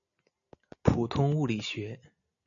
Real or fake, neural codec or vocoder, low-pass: real; none; 7.2 kHz